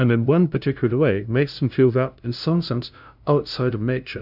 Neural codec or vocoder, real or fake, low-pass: codec, 16 kHz, 0.5 kbps, FunCodec, trained on LibriTTS, 25 frames a second; fake; 5.4 kHz